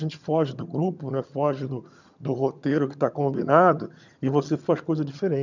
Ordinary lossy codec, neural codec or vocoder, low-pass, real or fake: none; vocoder, 22.05 kHz, 80 mel bands, HiFi-GAN; 7.2 kHz; fake